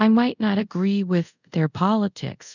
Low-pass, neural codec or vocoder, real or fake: 7.2 kHz; codec, 24 kHz, 0.5 kbps, DualCodec; fake